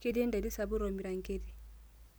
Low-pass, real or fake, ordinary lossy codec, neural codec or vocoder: none; real; none; none